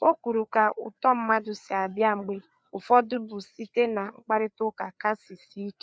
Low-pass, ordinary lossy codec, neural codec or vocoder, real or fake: none; none; codec, 16 kHz, 4 kbps, FreqCodec, larger model; fake